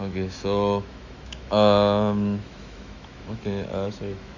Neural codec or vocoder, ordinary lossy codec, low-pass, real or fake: autoencoder, 48 kHz, 128 numbers a frame, DAC-VAE, trained on Japanese speech; none; 7.2 kHz; fake